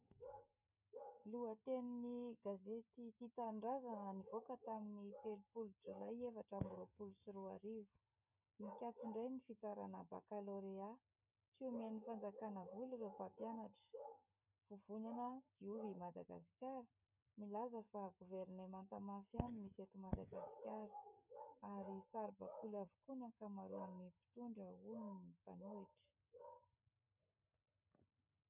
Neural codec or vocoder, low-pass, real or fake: codec, 16 kHz, 16 kbps, FreqCodec, smaller model; 3.6 kHz; fake